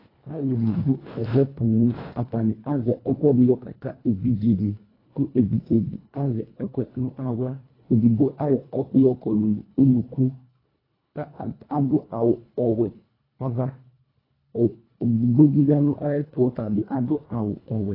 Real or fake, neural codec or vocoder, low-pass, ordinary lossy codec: fake; codec, 24 kHz, 1.5 kbps, HILCodec; 5.4 kHz; AAC, 24 kbps